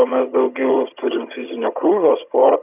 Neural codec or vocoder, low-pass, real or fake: vocoder, 22.05 kHz, 80 mel bands, HiFi-GAN; 3.6 kHz; fake